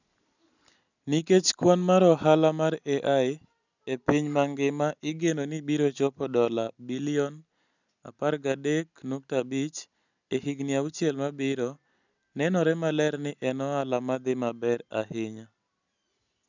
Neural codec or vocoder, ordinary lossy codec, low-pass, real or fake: none; none; 7.2 kHz; real